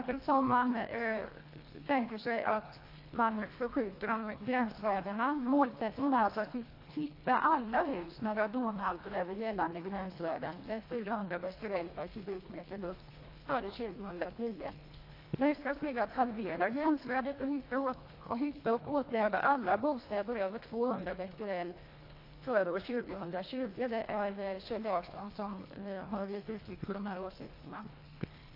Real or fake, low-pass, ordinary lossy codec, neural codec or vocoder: fake; 5.4 kHz; AAC, 32 kbps; codec, 24 kHz, 1.5 kbps, HILCodec